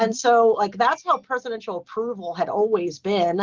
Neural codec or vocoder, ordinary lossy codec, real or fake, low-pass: none; Opus, 16 kbps; real; 7.2 kHz